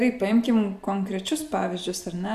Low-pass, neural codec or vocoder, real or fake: 14.4 kHz; none; real